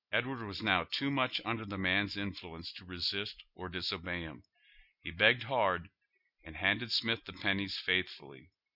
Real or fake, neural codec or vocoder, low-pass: real; none; 5.4 kHz